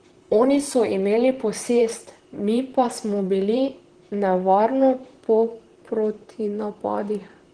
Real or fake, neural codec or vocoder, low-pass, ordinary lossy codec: fake; vocoder, 44.1 kHz, 128 mel bands, Pupu-Vocoder; 9.9 kHz; Opus, 16 kbps